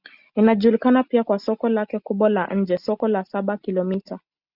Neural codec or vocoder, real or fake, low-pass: none; real; 5.4 kHz